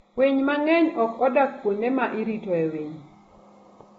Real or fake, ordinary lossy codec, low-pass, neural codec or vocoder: real; AAC, 24 kbps; 19.8 kHz; none